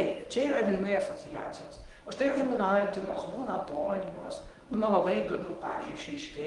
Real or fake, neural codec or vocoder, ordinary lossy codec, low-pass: fake; codec, 24 kHz, 0.9 kbps, WavTokenizer, medium speech release version 1; Opus, 32 kbps; 10.8 kHz